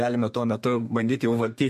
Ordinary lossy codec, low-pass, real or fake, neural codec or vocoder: MP3, 64 kbps; 14.4 kHz; fake; codec, 32 kHz, 1.9 kbps, SNAC